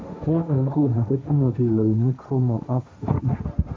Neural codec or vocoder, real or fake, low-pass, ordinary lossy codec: codec, 16 kHz, 1.1 kbps, Voila-Tokenizer; fake; none; none